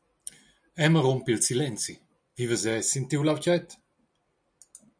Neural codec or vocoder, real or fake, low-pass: none; real; 9.9 kHz